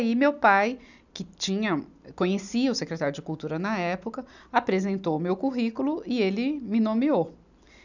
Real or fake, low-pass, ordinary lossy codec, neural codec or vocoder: real; 7.2 kHz; none; none